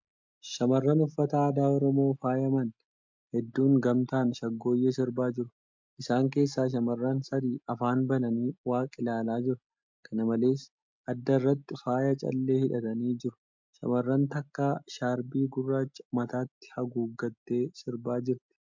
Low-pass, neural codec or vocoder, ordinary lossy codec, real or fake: 7.2 kHz; none; MP3, 64 kbps; real